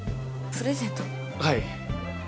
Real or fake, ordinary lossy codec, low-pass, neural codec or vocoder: real; none; none; none